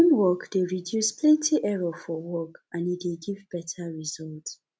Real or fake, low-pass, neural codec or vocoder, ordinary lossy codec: real; none; none; none